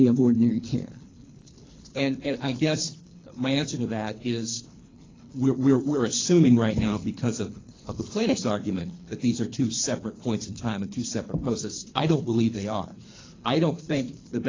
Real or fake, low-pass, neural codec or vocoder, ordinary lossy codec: fake; 7.2 kHz; codec, 24 kHz, 3 kbps, HILCodec; AAC, 32 kbps